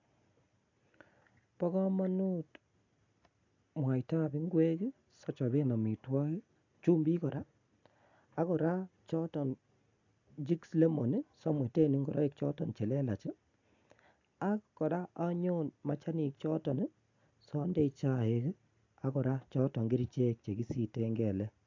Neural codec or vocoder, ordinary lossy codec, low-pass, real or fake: none; AAC, 48 kbps; 7.2 kHz; real